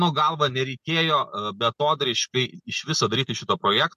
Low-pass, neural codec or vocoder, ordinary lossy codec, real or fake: 14.4 kHz; none; MP3, 64 kbps; real